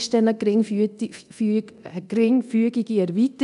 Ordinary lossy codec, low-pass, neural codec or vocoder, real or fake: none; 10.8 kHz; codec, 24 kHz, 0.9 kbps, DualCodec; fake